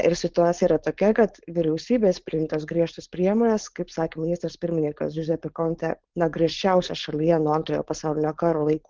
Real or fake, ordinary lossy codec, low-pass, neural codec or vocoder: fake; Opus, 16 kbps; 7.2 kHz; codec, 16 kHz, 4.8 kbps, FACodec